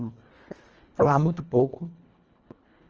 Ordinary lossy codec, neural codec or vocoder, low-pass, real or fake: Opus, 24 kbps; codec, 24 kHz, 1.5 kbps, HILCodec; 7.2 kHz; fake